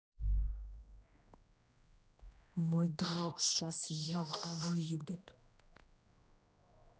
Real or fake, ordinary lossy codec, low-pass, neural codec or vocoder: fake; none; none; codec, 16 kHz, 1 kbps, X-Codec, HuBERT features, trained on general audio